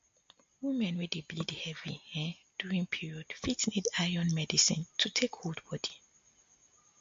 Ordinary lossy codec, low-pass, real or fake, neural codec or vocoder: MP3, 48 kbps; 7.2 kHz; real; none